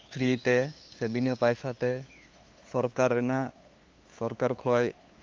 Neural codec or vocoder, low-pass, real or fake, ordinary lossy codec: codec, 16 kHz, 2 kbps, FunCodec, trained on LibriTTS, 25 frames a second; 7.2 kHz; fake; Opus, 32 kbps